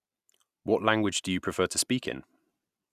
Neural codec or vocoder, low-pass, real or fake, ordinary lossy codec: none; 14.4 kHz; real; none